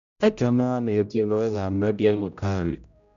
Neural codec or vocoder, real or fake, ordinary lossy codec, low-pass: codec, 16 kHz, 0.5 kbps, X-Codec, HuBERT features, trained on general audio; fake; none; 7.2 kHz